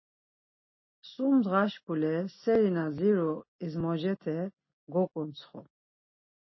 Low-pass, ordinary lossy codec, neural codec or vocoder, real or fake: 7.2 kHz; MP3, 24 kbps; none; real